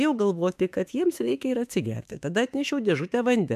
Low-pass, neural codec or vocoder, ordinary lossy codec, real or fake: 14.4 kHz; autoencoder, 48 kHz, 32 numbers a frame, DAC-VAE, trained on Japanese speech; AAC, 96 kbps; fake